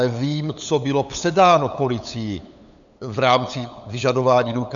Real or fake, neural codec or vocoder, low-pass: fake; codec, 16 kHz, 8 kbps, FunCodec, trained on LibriTTS, 25 frames a second; 7.2 kHz